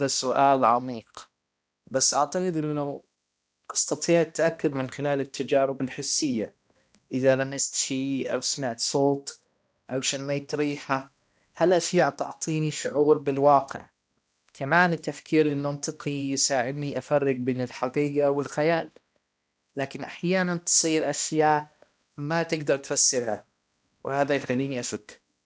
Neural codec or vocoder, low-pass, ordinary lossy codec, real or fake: codec, 16 kHz, 1 kbps, X-Codec, HuBERT features, trained on balanced general audio; none; none; fake